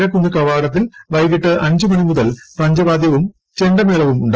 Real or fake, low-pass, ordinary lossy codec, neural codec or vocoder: real; 7.2 kHz; Opus, 16 kbps; none